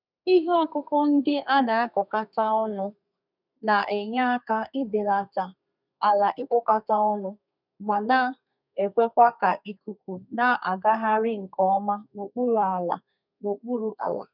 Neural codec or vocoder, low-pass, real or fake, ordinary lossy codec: codec, 32 kHz, 1.9 kbps, SNAC; 5.4 kHz; fake; none